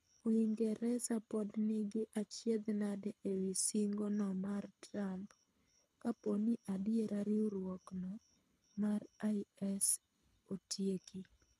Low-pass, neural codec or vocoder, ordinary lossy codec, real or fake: none; codec, 24 kHz, 6 kbps, HILCodec; none; fake